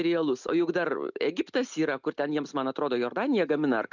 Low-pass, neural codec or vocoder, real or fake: 7.2 kHz; none; real